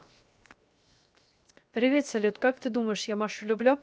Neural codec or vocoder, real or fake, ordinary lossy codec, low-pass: codec, 16 kHz, 0.7 kbps, FocalCodec; fake; none; none